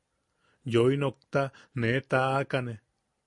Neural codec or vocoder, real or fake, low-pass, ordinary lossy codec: none; real; 10.8 kHz; MP3, 48 kbps